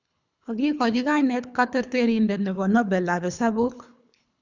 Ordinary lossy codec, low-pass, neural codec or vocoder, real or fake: none; 7.2 kHz; codec, 24 kHz, 3 kbps, HILCodec; fake